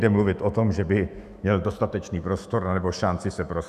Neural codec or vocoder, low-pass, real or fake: autoencoder, 48 kHz, 128 numbers a frame, DAC-VAE, trained on Japanese speech; 14.4 kHz; fake